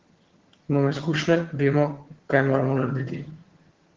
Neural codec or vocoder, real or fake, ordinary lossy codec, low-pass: vocoder, 22.05 kHz, 80 mel bands, HiFi-GAN; fake; Opus, 16 kbps; 7.2 kHz